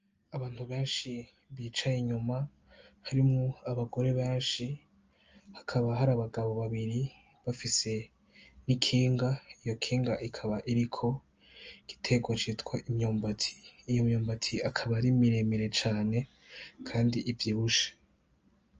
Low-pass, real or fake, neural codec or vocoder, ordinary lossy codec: 7.2 kHz; real; none; Opus, 24 kbps